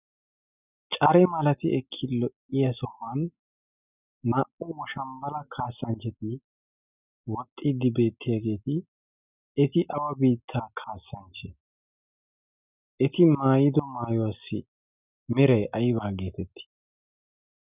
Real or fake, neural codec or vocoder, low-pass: real; none; 3.6 kHz